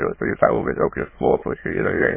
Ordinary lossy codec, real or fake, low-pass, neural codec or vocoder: MP3, 16 kbps; fake; 3.6 kHz; autoencoder, 22.05 kHz, a latent of 192 numbers a frame, VITS, trained on many speakers